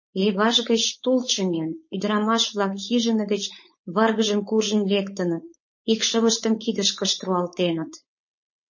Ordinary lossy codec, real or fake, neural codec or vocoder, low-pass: MP3, 32 kbps; fake; codec, 16 kHz, 4.8 kbps, FACodec; 7.2 kHz